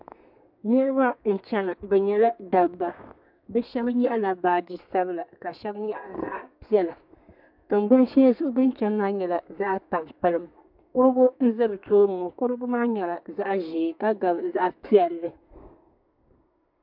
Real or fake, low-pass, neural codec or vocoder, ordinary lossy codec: fake; 5.4 kHz; codec, 32 kHz, 1.9 kbps, SNAC; MP3, 48 kbps